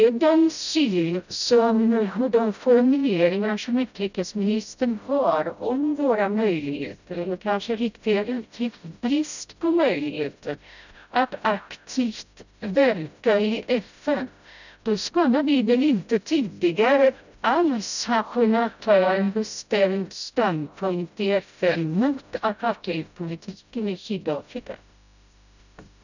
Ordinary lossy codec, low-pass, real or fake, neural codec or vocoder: none; 7.2 kHz; fake; codec, 16 kHz, 0.5 kbps, FreqCodec, smaller model